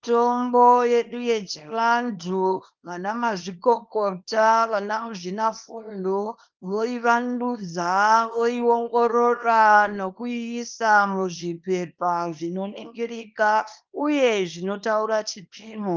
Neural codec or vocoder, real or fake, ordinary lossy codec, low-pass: codec, 24 kHz, 0.9 kbps, WavTokenizer, small release; fake; Opus, 24 kbps; 7.2 kHz